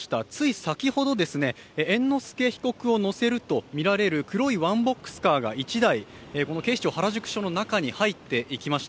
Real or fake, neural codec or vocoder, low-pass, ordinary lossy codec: real; none; none; none